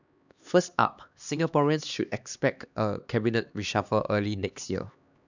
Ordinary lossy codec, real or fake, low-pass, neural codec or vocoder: none; fake; 7.2 kHz; codec, 16 kHz, 2 kbps, X-Codec, HuBERT features, trained on LibriSpeech